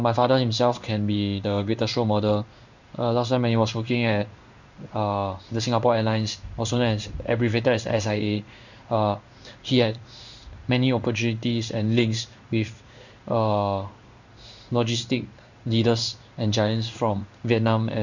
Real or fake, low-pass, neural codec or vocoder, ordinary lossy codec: fake; 7.2 kHz; codec, 16 kHz in and 24 kHz out, 1 kbps, XY-Tokenizer; none